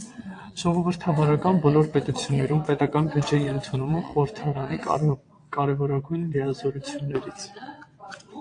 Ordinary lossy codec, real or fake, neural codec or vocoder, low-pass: AAC, 48 kbps; fake; vocoder, 22.05 kHz, 80 mel bands, WaveNeXt; 9.9 kHz